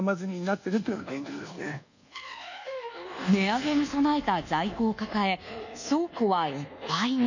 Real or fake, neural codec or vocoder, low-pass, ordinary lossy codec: fake; codec, 24 kHz, 1.2 kbps, DualCodec; 7.2 kHz; none